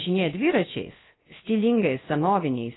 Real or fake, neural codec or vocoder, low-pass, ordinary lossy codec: fake; codec, 16 kHz, about 1 kbps, DyCAST, with the encoder's durations; 7.2 kHz; AAC, 16 kbps